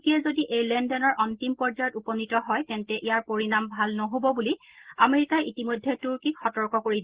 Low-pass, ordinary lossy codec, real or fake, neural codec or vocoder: 3.6 kHz; Opus, 16 kbps; real; none